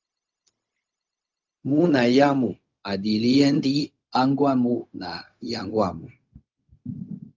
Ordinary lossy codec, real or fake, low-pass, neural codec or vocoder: Opus, 24 kbps; fake; 7.2 kHz; codec, 16 kHz, 0.4 kbps, LongCat-Audio-Codec